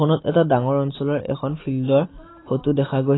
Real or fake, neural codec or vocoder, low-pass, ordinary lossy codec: real; none; 7.2 kHz; AAC, 16 kbps